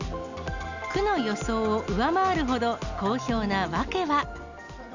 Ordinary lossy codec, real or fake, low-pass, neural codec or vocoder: none; real; 7.2 kHz; none